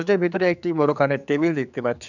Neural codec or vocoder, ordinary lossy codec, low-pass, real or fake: codec, 16 kHz, 4 kbps, X-Codec, HuBERT features, trained on general audio; none; 7.2 kHz; fake